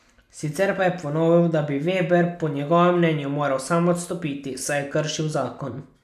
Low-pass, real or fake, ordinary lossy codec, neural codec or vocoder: 14.4 kHz; real; none; none